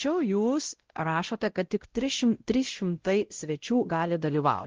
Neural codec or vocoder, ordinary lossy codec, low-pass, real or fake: codec, 16 kHz, 0.5 kbps, X-Codec, WavLM features, trained on Multilingual LibriSpeech; Opus, 16 kbps; 7.2 kHz; fake